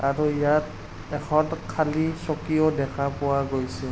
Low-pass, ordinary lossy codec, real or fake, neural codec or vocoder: none; none; real; none